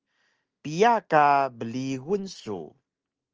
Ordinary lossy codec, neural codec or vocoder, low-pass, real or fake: Opus, 24 kbps; none; 7.2 kHz; real